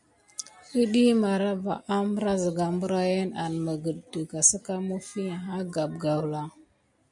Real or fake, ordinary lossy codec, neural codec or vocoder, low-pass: real; MP3, 64 kbps; none; 10.8 kHz